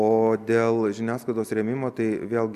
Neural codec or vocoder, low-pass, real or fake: none; 14.4 kHz; real